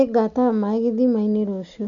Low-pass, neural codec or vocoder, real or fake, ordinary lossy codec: 7.2 kHz; none; real; none